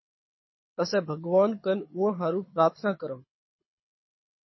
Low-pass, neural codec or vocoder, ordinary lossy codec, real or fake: 7.2 kHz; codec, 24 kHz, 6 kbps, HILCodec; MP3, 24 kbps; fake